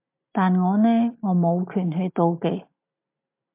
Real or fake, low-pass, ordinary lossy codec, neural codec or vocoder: real; 3.6 kHz; MP3, 32 kbps; none